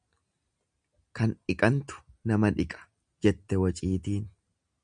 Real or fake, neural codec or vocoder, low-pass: real; none; 9.9 kHz